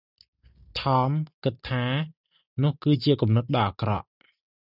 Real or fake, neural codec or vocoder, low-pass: real; none; 5.4 kHz